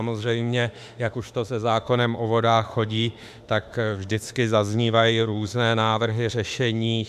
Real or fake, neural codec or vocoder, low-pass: fake; autoencoder, 48 kHz, 32 numbers a frame, DAC-VAE, trained on Japanese speech; 14.4 kHz